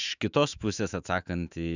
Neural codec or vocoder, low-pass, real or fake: autoencoder, 48 kHz, 128 numbers a frame, DAC-VAE, trained on Japanese speech; 7.2 kHz; fake